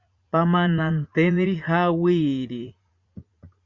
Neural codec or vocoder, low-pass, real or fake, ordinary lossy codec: vocoder, 44.1 kHz, 80 mel bands, Vocos; 7.2 kHz; fake; Opus, 64 kbps